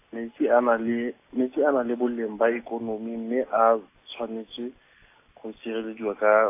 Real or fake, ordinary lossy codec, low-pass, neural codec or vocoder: real; AAC, 24 kbps; 3.6 kHz; none